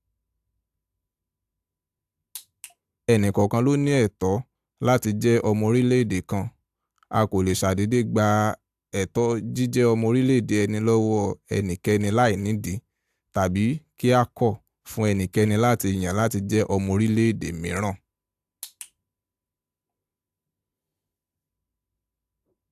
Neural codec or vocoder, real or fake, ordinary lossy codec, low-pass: none; real; AAC, 96 kbps; 14.4 kHz